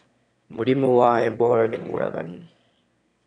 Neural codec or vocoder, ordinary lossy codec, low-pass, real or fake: autoencoder, 22.05 kHz, a latent of 192 numbers a frame, VITS, trained on one speaker; none; 9.9 kHz; fake